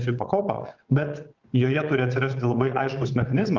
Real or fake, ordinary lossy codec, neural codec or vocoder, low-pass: fake; Opus, 32 kbps; vocoder, 22.05 kHz, 80 mel bands, WaveNeXt; 7.2 kHz